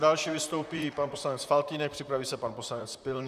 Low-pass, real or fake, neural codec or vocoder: 14.4 kHz; fake; vocoder, 44.1 kHz, 128 mel bands, Pupu-Vocoder